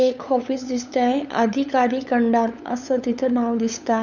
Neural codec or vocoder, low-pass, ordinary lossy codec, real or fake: codec, 16 kHz, 8 kbps, FunCodec, trained on LibriTTS, 25 frames a second; 7.2 kHz; none; fake